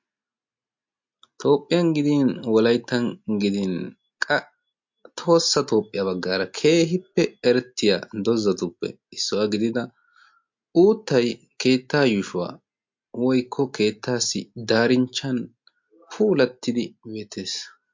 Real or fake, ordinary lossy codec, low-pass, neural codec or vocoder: real; MP3, 48 kbps; 7.2 kHz; none